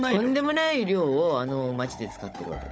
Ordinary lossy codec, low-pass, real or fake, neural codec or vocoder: none; none; fake; codec, 16 kHz, 16 kbps, FunCodec, trained on Chinese and English, 50 frames a second